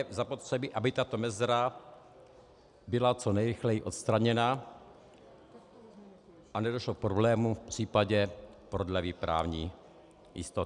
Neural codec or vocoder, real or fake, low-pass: none; real; 10.8 kHz